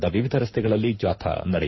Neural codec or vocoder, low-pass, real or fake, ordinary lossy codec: codec, 24 kHz, 6 kbps, HILCodec; 7.2 kHz; fake; MP3, 24 kbps